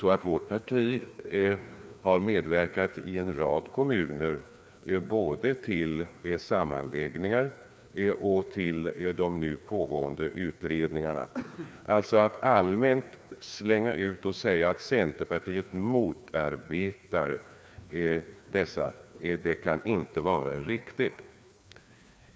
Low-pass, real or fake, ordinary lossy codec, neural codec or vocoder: none; fake; none; codec, 16 kHz, 2 kbps, FreqCodec, larger model